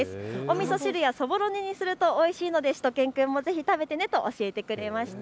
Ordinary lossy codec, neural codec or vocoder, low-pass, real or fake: none; none; none; real